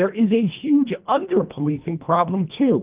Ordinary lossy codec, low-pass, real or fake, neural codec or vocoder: Opus, 32 kbps; 3.6 kHz; fake; codec, 24 kHz, 1.5 kbps, HILCodec